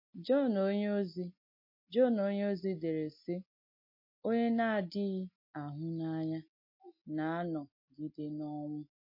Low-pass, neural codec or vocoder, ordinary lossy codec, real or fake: 5.4 kHz; none; MP3, 32 kbps; real